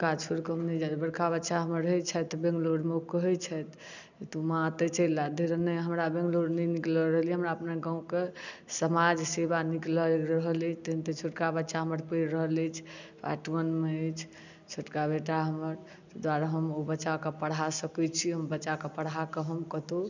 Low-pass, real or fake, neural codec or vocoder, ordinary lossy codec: 7.2 kHz; real; none; none